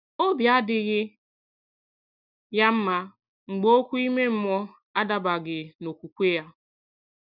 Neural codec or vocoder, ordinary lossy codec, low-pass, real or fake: none; none; 5.4 kHz; real